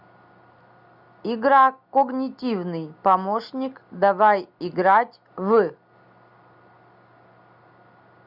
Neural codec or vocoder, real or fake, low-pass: none; real; 5.4 kHz